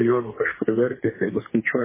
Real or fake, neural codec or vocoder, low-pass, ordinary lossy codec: fake; codec, 32 kHz, 1.9 kbps, SNAC; 3.6 kHz; MP3, 16 kbps